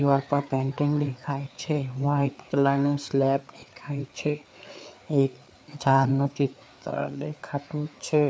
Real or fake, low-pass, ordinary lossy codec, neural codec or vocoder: fake; none; none; codec, 16 kHz, 4 kbps, FreqCodec, larger model